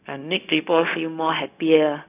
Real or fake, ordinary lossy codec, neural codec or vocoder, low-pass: fake; none; codec, 16 kHz, 0.4 kbps, LongCat-Audio-Codec; 3.6 kHz